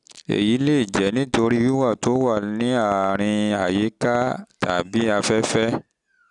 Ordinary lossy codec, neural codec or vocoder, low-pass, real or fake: none; none; 10.8 kHz; real